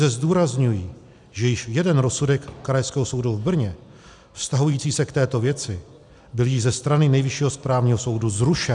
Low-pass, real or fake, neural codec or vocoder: 10.8 kHz; real; none